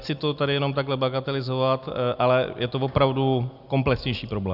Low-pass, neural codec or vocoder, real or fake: 5.4 kHz; none; real